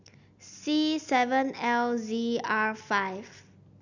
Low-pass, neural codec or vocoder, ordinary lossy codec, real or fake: 7.2 kHz; none; none; real